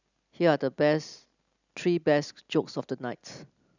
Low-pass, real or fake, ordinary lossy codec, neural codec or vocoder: 7.2 kHz; real; none; none